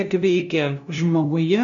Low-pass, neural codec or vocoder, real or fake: 7.2 kHz; codec, 16 kHz, 0.5 kbps, FunCodec, trained on LibriTTS, 25 frames a second; fake